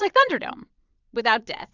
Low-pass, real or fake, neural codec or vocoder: 7.2 kHz; fake; vocoder, 22.05 kHz, 80 mel bands, Vocos